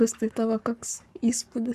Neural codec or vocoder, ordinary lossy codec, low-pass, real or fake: codec, 44.1 kHz, 7.8 kbps, Pupu-Codec; Opus, 64 kbps; 14.4 kHz; fake